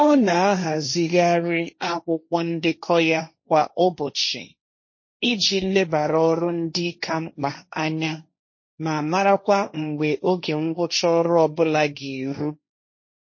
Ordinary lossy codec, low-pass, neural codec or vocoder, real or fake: MP3, 32 kbps; 7.2 kHz; codec, 16 kHz, 1.1 kbps, Voila-Tokenizer; fake